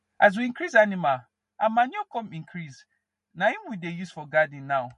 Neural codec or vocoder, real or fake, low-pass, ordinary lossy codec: none; real; 14.4 kHz; MP3, 48 kbps